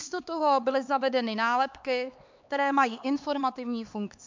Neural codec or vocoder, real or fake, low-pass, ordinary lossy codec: codec, 16 kHz, 4 kbps, X-Codec, HuBERT features, trained on LibriSpeech; fake; 7.2 kHz; MP3, 64 kbps